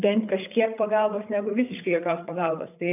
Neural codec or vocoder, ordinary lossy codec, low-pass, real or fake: codec, 16 kHz, 8 kbps, FreqCodec, larger model; AAC, 32 kbps; 3.6 kHz; fake